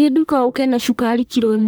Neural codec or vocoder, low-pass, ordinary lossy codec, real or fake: codec, 44.1 kHz, 1.7 kbps, Pupu-Codec; none; none; fake